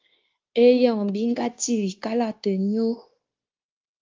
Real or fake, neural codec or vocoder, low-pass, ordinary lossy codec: fake; codec, 16 kHz, 0.9 kbps, LongCat-Audio-Codec; 7.2 kHz; Opus, 32 kbps